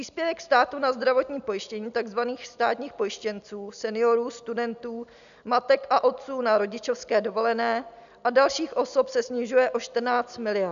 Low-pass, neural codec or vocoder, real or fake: 7.2 kHz; none; real